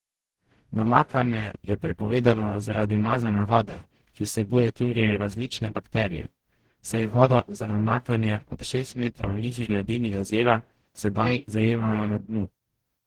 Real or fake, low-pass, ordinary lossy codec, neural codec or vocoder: fake; 19.8 kHz; Opus, 16 kbps; codec, 44.1 kHz, 0.9 kbps, DAC